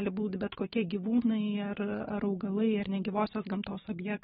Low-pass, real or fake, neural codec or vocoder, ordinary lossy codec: 10.8 kHz; real; none; AAC, 16 kbps